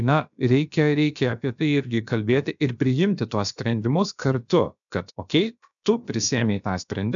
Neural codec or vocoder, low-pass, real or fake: codec, 16 kHz, about 1 kbps, DyCAST, with the encoder's durations; 7.2 kHz; fake